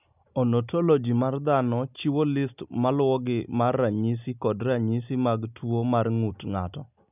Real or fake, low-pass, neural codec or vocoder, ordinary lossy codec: real; 3.6 kHz; none; none